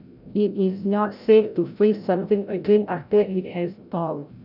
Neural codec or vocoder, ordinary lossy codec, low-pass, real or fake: codec, 16 kHz, 0.5 kbps, FreqCodec, larger model; none; 5.4 kHz; fake